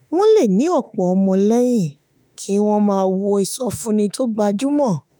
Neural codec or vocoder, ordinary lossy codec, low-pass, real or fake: autoencoder, 48 kHz, 32 numbers a frame, DAC-VAE, trained on Japanese speech; none; none; fake